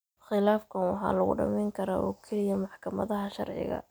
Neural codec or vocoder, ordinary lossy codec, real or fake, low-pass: none; none; real; none